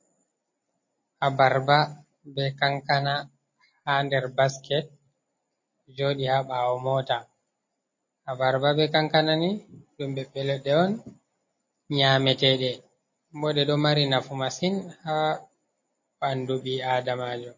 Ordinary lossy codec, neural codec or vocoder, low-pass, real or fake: MP3, 32 kbps; none; 7.2 kHz; real